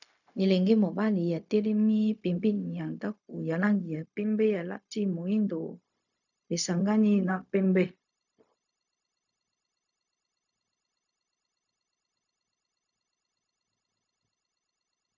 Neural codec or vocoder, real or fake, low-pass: codec, 16 kHz, 0.4 kbps, LongCat-Audio-Codec; fake; 7.2 kHz